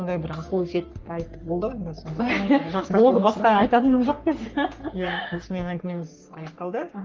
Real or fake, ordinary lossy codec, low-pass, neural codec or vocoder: fake; Opus, 32 kbps; 7.2 kHz; codec, 44.1 kHz, 3.4 kbps, Pupu-Codec